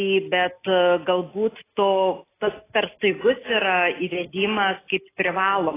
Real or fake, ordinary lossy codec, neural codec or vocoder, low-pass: real; AAC, 16 kbps; none; 3.6 kHz